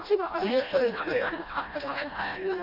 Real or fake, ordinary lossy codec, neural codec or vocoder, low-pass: fake; none; codec, 16 kHz, 1 kbps, FreqCodec, smaller model; 5.4 kHz